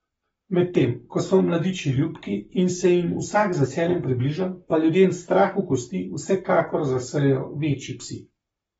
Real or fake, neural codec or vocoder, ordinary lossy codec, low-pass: fake; codec, 44.1 kHz, 7.8 kbps, Pupu-Codec; AAC, 24 kbps; 19.8 kHz